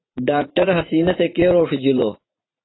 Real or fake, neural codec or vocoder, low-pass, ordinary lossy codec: fake; vocoder, 44.1 kHz, 80 mel bands, Vocos; 7.2 kHz; AAC, 16 kbps